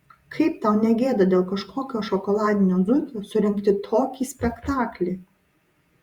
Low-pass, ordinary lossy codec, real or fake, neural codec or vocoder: 19.8 kHz; Opus, 64 kbps; fake; vocoder, 48 kHz, 128 mel bands, Vocos